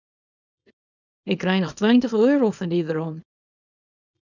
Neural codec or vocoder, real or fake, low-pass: codec, 24 kHz, 0.9 kbps, WavTokenizer, small release; fake; 7.2 kHz